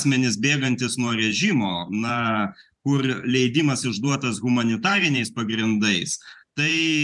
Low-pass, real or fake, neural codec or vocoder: 10.8 kHz; fake; vocoder, 44.1 kHz, 128 mel bands every 512 samples, BigVGAN v2